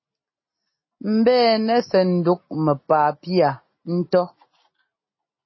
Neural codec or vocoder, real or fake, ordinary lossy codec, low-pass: none; real; MP3, 24 kbps; 7.2 kHz